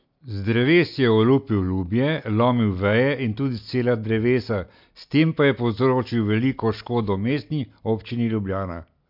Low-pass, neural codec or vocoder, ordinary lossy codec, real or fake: 5.4 kHz; none; MP3, 48 kbps; real